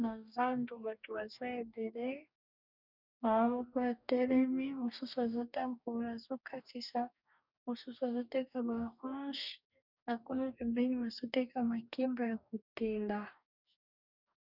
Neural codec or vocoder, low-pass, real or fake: codec, 44.1 kHz, 2.6 kbps, DAC; 5.4 kHz; fake